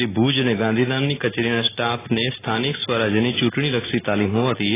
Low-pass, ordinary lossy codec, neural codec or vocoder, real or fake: 3.6 kHz; AAC, 16 kbps; none; real